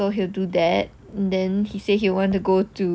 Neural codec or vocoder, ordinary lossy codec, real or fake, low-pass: none; none; real; none